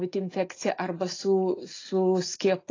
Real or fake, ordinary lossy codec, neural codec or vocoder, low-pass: real; AAC, 32 kbps; none; 7.2 kHz